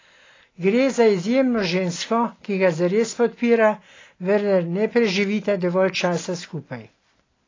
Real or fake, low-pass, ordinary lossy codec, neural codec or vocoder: real; 7.2 kHz; AAC, 32 kbps; none